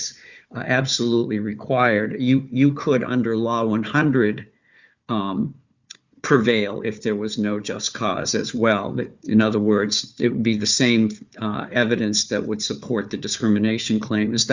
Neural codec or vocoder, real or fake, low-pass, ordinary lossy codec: codec, 16 kHz, 4 kbps, FunCodec, trained on Chinese and English, 50 frames a second; fake; 7.2 kHz; Opus, 64 kbps